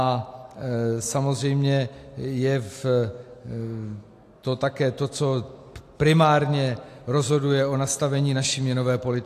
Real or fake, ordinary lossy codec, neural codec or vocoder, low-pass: real; AAC, 64 kbps; none; 14.4 kHz